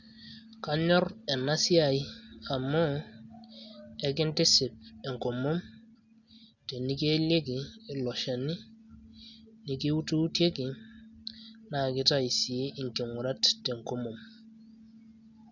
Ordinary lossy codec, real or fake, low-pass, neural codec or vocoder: none; real; 7.2 kHz; none